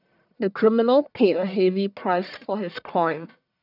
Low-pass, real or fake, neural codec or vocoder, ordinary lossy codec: 5.4 kHz; fake; codec, 44.1 kHz, 1.7 kbps, Pupu-Codec; none